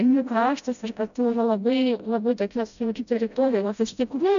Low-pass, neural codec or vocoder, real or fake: 7.2 kHz; codec, 16 kHz, 0.5 kbps, FreqCodec, smaller model; fake